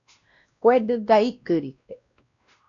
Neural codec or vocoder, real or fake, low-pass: codec, 16 kHz, 1 kbps, X-Codec, WavLM features, trained on Multilingual LibriSpeech; fake; 7.2 kHz